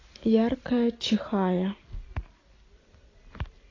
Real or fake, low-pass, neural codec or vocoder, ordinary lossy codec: real; 7.2 kHz; none; AAC, 32 kbps